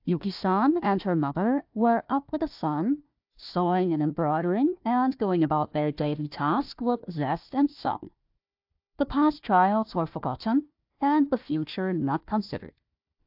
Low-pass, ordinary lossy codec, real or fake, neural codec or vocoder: 5.4 kHz; AAC, 48 kbps; fake; codec, 16 kHz, 1 kbps, FunCodec, trained on Chinese and English, 50 frames a second